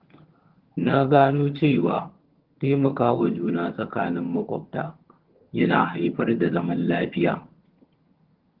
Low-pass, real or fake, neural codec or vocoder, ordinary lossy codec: 5.4 kHz; fake; vocoder, 22.05 kHz, 80 mel bands, HiFi-GAN; Opus, 16 kbps